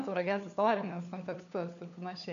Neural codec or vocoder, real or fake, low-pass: codec, 16 kHz, 4 kbps, FunCodec, trained on LibriTTS, 50 frames a second; fake; 7.2 kHz